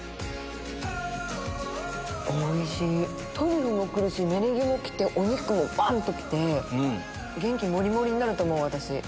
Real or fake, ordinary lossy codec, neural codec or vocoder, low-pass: real; none; none; none